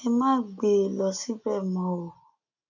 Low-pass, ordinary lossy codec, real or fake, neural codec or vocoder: 7.2 kHz; none; real; none